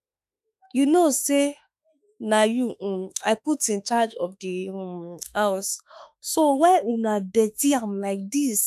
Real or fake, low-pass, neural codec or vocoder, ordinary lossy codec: fake; 14.4 kHz; autoencoder, 48 kHz, 32 numbers a frame, DAC-VAE, trained on Japanese speech; none